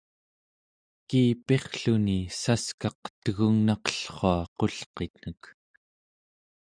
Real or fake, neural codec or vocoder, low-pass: real; none; 9.9 kHz